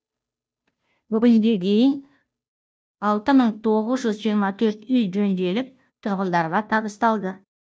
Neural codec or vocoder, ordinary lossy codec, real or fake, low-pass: codec, 16 kHz, 0.5 kbps, FunCodec, trained on Chinese and English, 25 frames a second; none; fake; none